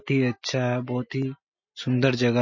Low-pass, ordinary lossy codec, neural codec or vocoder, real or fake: 7.2 kHz; MP3, 32 kbps; none; real